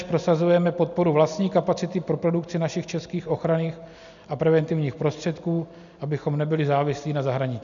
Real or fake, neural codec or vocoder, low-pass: real; none; 7.2 kHz